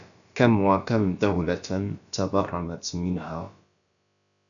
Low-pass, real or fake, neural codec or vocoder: 7.2 kHz; fake; codec, 16 kHz, about 1 kbps, DyCAST, with the encoder's durations